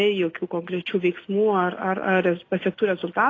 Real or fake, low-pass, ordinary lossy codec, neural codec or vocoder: real; 7.2 kHz; AAC, 32 kbps; none